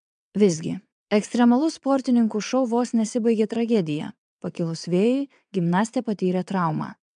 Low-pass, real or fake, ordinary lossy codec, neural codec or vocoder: 9.9 kHz; fake; MP3, 96 kbps; vocoder, 22.05 kHz, 80 mel bands, WaveNeXt